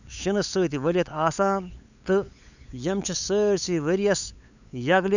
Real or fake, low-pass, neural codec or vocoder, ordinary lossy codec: fake; 7.2 kHz; codec, 16 kHz, 8 kbps, FunCodec, trained on LibriTTS, 25 frames a second; none